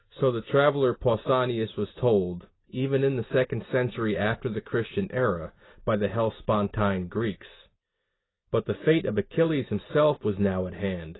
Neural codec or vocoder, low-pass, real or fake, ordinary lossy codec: none; 7.2 kHz; real; AAC, 16 kbps